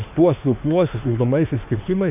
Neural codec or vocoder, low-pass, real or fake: autoencoder, 48 kHz, 32 numbers a frame, DAC-VAE, trained on Japanese speech; 3.6 kHz; fake